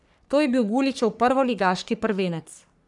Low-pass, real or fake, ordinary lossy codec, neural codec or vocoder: 10.8 kHz; fake; none; codec, 44.1 kHz, 3.4 kbps, Pupu-Codec